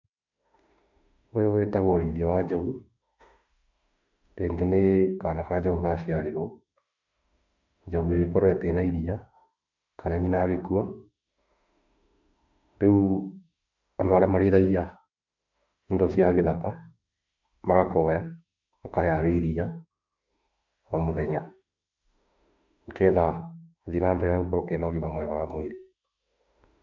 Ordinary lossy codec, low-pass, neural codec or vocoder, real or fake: none; 7.2 kHz; autoencoder, 48 kHz, 32 numbers a frame, DAC-VAE, trained on Japanese speech; fake